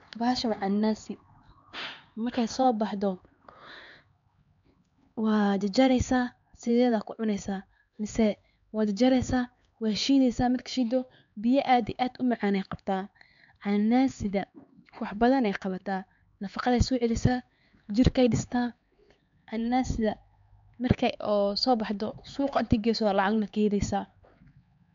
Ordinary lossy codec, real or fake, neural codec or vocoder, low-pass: MP3, 64 kbps; fake; codec, 16 kHz, 4 kbps, X-Codec, HuBERT features, trained on LibriSpeech; 7.2 kHz